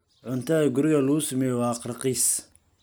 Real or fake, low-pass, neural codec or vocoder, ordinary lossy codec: real; none; none; none